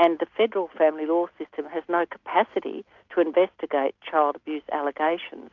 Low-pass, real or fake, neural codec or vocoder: 7.2 kHz; real; none